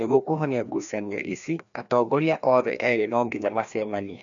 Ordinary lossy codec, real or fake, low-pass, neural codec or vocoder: none; fake; 7.2 kHz; codec, 16 kHz, 1 kbps, FreqCodec, larger model